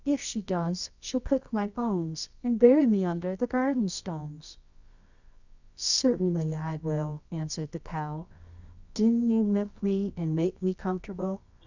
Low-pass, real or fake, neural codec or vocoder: 7.2 kHz; fake; codec, 24 kHz, 0.9 kbps, WavTokenizer, medium music audio release